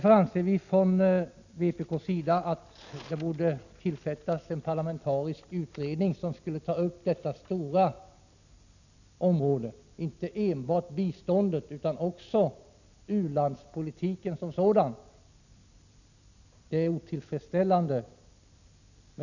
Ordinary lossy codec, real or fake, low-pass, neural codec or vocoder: none; real; 7.2 kHz; none